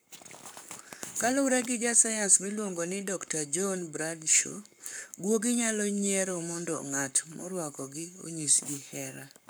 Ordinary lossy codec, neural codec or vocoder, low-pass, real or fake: none; codec, 44.1 kHz, 7.8 kbps, Pupu-Codec; none; fake